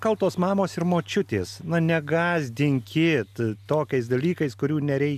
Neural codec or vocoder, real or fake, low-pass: none; real; 14.4 kHz